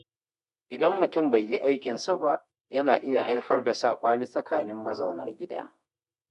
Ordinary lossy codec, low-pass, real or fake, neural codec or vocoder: MP3, 64 kbps; 10.8 kHz; fake; codec, 24 kHz, 0.9 kbps, WavTokenizer, medium music audio release